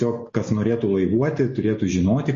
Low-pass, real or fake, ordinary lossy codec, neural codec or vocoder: 7.2 kHz; real; MP3, 32 kbps; none